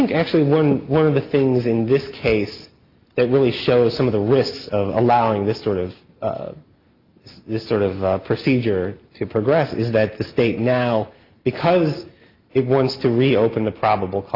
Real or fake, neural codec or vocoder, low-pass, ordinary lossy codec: real; none; 5.4 kHz; Opus, 32 kbps